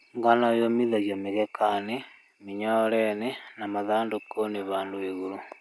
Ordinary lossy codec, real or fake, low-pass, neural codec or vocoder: none; real; none; none